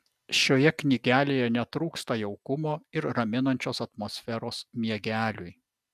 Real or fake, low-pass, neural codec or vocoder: real; 14.4 kHz; none